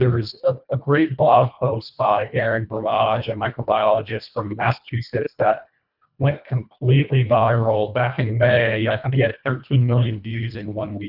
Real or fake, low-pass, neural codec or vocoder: fake; 5.4 kHz; codec, 24 kHz, 1.5 kbps, HILCodec